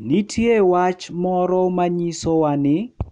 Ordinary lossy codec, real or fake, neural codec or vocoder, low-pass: none; real; none; 9.9 kHz